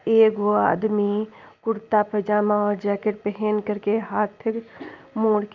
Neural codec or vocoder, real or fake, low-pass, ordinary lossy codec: none; real; 7.2 kHz; Opus, 24 kbps